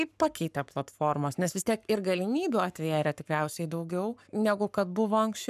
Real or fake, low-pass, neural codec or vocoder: fake; 14.4 kHz; codec, 44.1 kHz, 7.8 kbps, Pupu-Codec